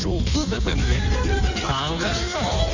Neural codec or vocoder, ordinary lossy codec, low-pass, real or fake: codec, 16 kHz in and 24 kHz out, 1.1 kbps, FireRedTTS-2 codec; none; 7.2 kHz; fake